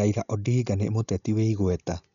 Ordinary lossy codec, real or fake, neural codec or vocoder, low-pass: none; real; none; 7.2 kHz